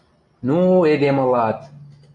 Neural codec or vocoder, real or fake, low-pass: none; real; 10.8 kHz